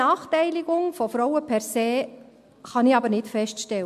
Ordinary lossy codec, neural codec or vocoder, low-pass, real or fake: MP3, 64 kbps; none; 14.4 kHz; real